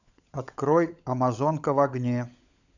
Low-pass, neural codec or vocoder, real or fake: 7.2 kHz; codec, 16 kHz, 16 kbps, FunCodec, trained on LibriTTS, 50 frames a second; fake